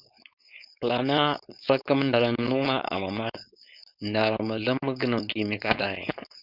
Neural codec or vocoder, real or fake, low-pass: codec, 16 kHz, 4.8 kbps, FACodec; fake; 5.4 kHz